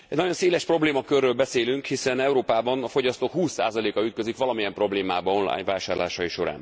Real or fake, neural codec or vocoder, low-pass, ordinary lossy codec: real; none; none; none